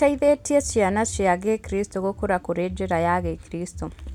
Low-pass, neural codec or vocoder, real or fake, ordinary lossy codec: 19.8 kHz; none; real; none